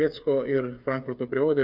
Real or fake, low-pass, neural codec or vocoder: fake; 5.4 kHz; codec, 16 kHz, 8 kbps, FreqCodec, smaller model